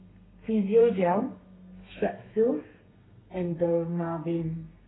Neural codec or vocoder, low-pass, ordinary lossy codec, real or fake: codec, 32 kHz, 1.9 kbps, SNAC; 7.2 kHz; AAC, 16 kbps; fake